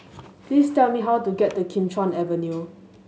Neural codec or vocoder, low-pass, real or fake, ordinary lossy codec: none; none; real; none